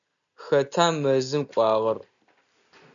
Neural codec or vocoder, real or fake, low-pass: none; real; 7.2 kHz